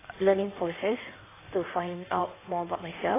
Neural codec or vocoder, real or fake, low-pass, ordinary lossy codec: codec, 16 kHz in and 24 kHz out, 1.1 kbps, FireRedTTS-2 codec; fake; 3.6 kHz; AAC, 16 kbps